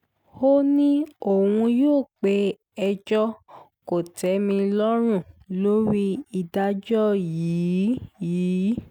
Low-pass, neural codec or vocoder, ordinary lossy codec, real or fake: 19.8 kHz; none; none; real